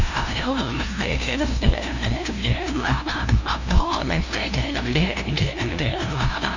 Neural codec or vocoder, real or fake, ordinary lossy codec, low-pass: codec, 16 kHz, 0.5 kbps, FunCodec, trained on LibriTTS, 25 frames a second; fake; none; 7.2 kHz